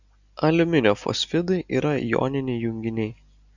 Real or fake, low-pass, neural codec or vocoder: real; 7.2 kHz; none